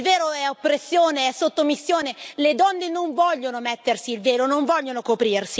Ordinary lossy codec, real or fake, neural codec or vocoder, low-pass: none; real; none; none